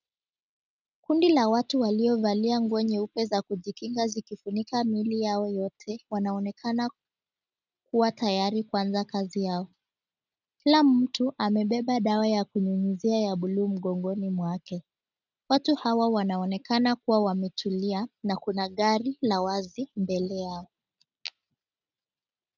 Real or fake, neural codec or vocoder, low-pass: real; none; 7.2 kHz